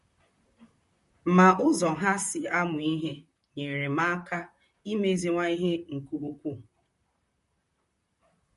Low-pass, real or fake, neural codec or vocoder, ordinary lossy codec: 14.4 kHz; fake; vocoder, 44.1 kHz, 128 mel bands, Pupu-Vocoder; MP3, 48 kbps